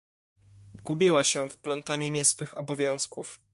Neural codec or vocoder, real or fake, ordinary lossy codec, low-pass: codec, 24 kHz, 1 kbps, SNAC; fake; MP3, 64 kbps; 10.8 kHz